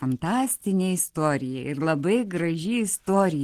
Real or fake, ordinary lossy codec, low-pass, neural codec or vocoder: real; Opus, 16 kbps; 14.4 kHz; none